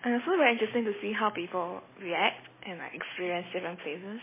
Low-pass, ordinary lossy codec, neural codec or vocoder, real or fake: 3.6 kHz; MP3, 16 kbps; none; real